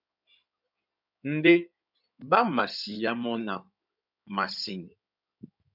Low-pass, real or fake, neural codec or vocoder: 5.4 kHz; fake; codec, 16 kHz in and 24 kHz out, 2.2 kbps, FireRedTTS-2 codec